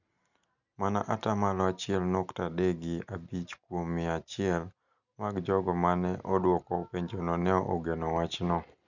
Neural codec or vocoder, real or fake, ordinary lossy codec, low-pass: none; real; none; 7.2 kHz